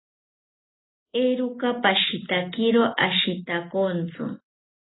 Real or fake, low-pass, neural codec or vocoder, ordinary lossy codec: real; 7.2 kHz; none; AAC, 16 kbps